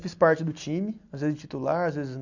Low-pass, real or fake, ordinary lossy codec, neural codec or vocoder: 7.2 kHz; real; none; none